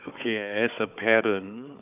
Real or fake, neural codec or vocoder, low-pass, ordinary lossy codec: fake; codec, 16 kHz, 4 kbps, FunCodec, trained on Chinese and English, 50 frames a second; 3.6 kHz; none